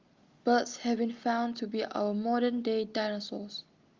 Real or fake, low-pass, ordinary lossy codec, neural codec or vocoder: real; 7.2 kHz; Opus, 32 kbps; none